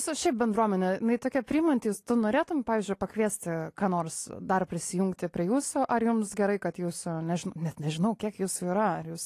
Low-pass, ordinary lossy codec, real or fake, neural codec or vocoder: 14.4 kHz; AAC, 48 kbps; real; none